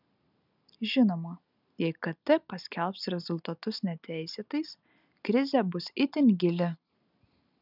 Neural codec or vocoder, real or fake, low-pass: none; real; 5.4 kHz